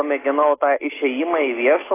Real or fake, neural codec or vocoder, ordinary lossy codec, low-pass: real; none; AAC, 16 kbps; 3.6 kHz